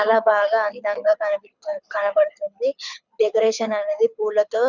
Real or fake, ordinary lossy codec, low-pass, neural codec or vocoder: fake; none; 7.2 kHz; codec, 24 kHz, 6 kbps, HILCodec